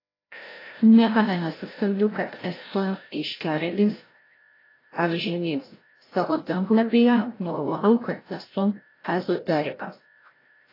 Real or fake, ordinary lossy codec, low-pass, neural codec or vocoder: fake; AAC, 24 kbps; 5.4 kHz; codec, 16 kHz, 0.5 kbps, FreqCodec, larger model